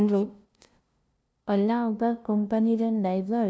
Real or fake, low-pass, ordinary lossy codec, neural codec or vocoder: fake; none; none; codec, 16 kHz, 0.5 kbps, FunCodec, trained on LibriTTS, 25 frames a second